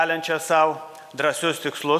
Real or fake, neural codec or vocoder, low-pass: real; none; 14.4 kHz